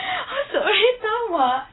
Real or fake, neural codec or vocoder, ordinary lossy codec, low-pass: real; none; AAC, 16 kbps; 7.2 kHz